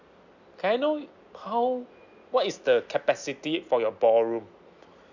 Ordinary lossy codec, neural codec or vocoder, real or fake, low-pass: none; none; real; 7.2 kHz